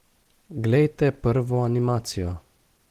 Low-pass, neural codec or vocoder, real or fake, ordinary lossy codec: 14.4 kHz; none; real; Opus, 16 kbps